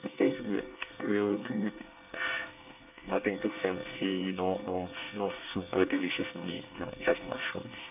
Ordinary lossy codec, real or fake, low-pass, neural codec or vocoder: none; fake; 3.6 kHz; codec, 24 kHz, 1 kbps, SNAC